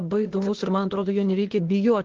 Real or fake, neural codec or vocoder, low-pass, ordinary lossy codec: fake; codec, 16 kHz, 0.8 kbps, ZipCodec; 7.2 kHz; Opus, 16 kbps